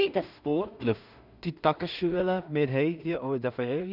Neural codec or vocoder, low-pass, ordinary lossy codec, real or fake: codec, 16 kHz in and 24 kHz out, 0.4 kbps, LongCat-Audio-Codec, two codebook decoder; 5.4 kHz; none; fake